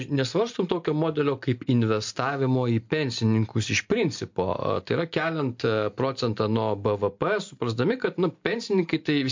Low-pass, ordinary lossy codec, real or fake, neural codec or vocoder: 7.2 kHz; MP3, 48 kbps; real; none